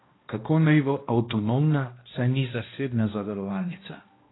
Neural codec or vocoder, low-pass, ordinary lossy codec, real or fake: codec, 16 kHz, 1 kbps, X-Codec, HuBERT features, trained on balanced general audio; 7.2 kHz; AAC, 16 kbps; fake